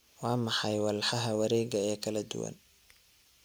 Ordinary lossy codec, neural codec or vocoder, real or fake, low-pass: none; none; real; none